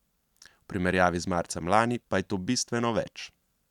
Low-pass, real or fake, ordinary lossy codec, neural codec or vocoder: 19.8 kHz; real; none; none